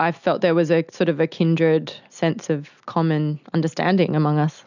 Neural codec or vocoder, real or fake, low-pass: none; real; 7.2 kHz